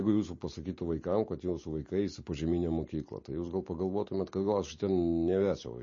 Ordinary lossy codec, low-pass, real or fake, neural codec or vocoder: MP3, 32 kbps; 7.2 kHz; real; none